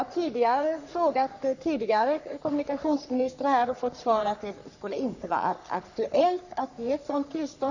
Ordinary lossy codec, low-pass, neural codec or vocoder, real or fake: none; 7.2 kHz; codec, 44.1 kHz, 3.4 kbps, Pupu-Codec; fake